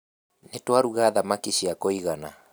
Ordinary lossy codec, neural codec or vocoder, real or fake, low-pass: none; none; real; none